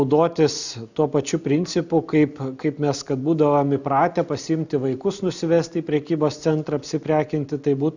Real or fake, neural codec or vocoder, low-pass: real; none; 7.2 kHz